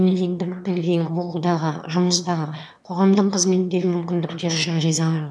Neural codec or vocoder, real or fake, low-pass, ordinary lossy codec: autoencoder, 22.05 kHz, a latent of 192 numbers a frame, VITS, trained on one speaker; fake; none; none